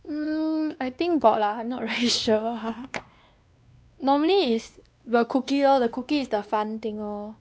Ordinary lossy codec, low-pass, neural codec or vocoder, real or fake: none; none; codec, 16 kHz, 2 kbps, X-Codec, WavLM features, trained on Multilingual LibriSpeech; fake